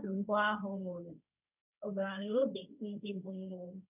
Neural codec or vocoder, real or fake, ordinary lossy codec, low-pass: codec, 16 kHz, 1.1 kbps, Voila-Tokenizer; fake; none; 3.6 kHz